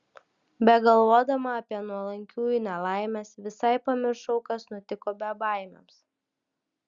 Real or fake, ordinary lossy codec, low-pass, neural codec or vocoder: real; Opus, 64 kbps; 7.2 kHz; none